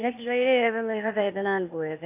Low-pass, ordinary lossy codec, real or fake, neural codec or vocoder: 3.6 kHz; AAC, 24 kbps; fake; codec, 16 kHz, 0.8 kbps, ZipCodec